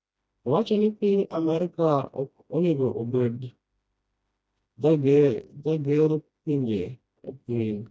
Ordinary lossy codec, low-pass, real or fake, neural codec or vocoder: none; none; fake; codec, 16 kHz, 1 kbps, FreqCodec, smaller model